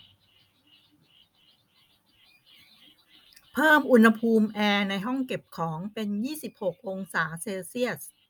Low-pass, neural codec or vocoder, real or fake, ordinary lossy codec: none; none; real; none